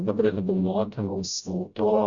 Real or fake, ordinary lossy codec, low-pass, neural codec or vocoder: fake; Opus, 64 kbps; 7.2 kHz; codec, 16 kHz, 0.5 kbps, FreqCodec, smaller model